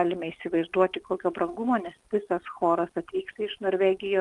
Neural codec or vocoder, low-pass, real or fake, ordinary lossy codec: none; 10.8 kHz; real; Opus, 32 kbps